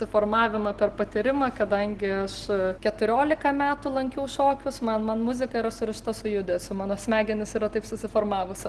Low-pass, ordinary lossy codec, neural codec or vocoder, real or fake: 10.8 kHz; Opus, 16 kbps; none; real